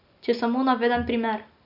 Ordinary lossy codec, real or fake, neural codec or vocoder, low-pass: none; real; none; 5.4 kHz